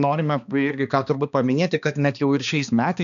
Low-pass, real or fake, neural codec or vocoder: 7.2 kHz; fake; codec, 16 kHz, 2 kbps, X-Codec, HuBERT features, trained on balanced general audio